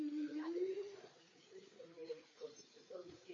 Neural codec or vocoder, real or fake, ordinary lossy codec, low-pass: codec, 16 kHz, 4 kbps, FreqCodec, larger model; fake; MP3, 32 kbps; 7.2 kHz